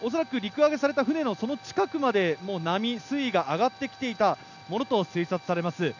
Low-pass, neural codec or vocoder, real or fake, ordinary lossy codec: 7.2 kHz; none; real; none